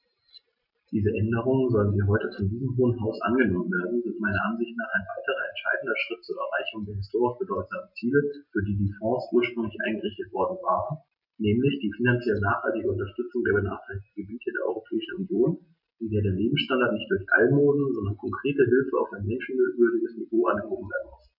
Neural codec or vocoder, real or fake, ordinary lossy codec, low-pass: none; real; none; 5.4 kHz